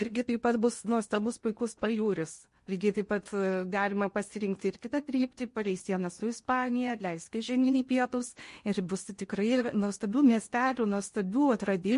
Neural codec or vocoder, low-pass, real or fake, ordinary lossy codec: codec, 16 kHz in and 24 kHz out, 0.8 kbps, FocalCodec, streaming, 65536 codes; 10.8 kHz; fake; MP3, 48 kbps